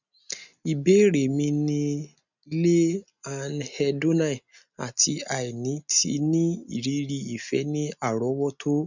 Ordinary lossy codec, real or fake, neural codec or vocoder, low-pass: none; real; none; 7.2 kHz